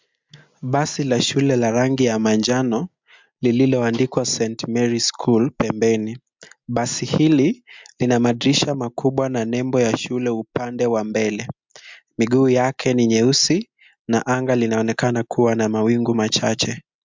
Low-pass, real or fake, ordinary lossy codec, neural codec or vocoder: 7.2 kHz; real; MP3, 64 kbps; none